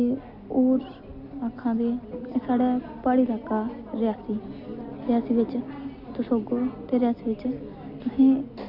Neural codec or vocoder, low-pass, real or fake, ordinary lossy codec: none; 5.4 kHz; real; none